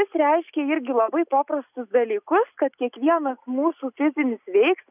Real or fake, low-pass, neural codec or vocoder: real; 3.6 kHz; none